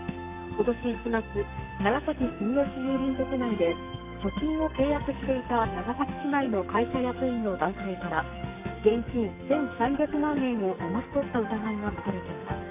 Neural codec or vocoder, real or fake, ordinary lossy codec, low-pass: codec, 44.1 kHz, 2.6 kbps, SNAC; fake; none; 3.6 kHz